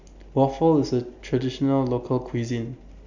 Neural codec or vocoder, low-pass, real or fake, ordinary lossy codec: none; 7.2 kHz; real; none